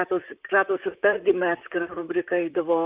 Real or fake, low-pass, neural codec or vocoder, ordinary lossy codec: fake; 3.6 kHz; vocoder, 44.1 kHz, 128 mel bands, Pupu-Vocoder; Opus, 24 kbps